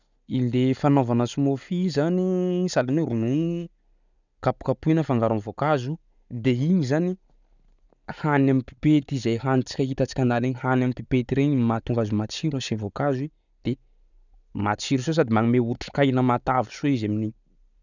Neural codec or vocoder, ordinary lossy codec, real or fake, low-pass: none; none; real; 7.2 kHz